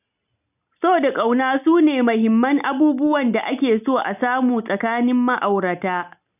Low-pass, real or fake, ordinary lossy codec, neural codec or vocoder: 3.6 kHz; real; none; none